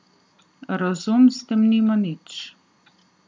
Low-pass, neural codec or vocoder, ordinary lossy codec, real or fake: none; none; none; real